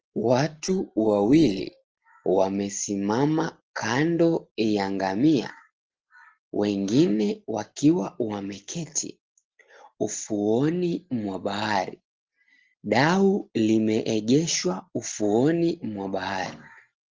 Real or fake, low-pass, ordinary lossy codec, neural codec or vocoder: real; 7.2 kHz; Opus, 16 kbps; none